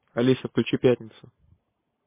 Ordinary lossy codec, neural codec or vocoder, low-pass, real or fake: MP3, 16 kbps; none; 3.6 kHz; real